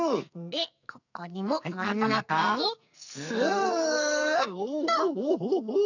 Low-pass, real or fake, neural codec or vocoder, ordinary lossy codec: 7.2 kHz; fake; codec, 44.1 kHz, 2.6 kbps, SNAC; none